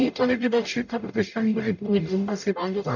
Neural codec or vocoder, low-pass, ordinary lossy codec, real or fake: codec, 44.1 kHz, 0.9 kbps, DAC; 7.2 kHz; none; fake